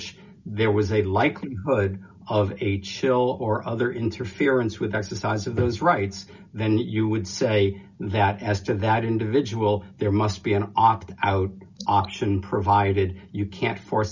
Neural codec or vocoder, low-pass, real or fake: none; 7.2 kHz; real